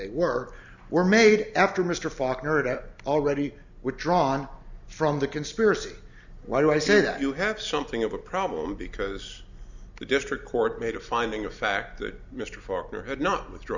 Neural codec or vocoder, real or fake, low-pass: none; real; 7.2 kHz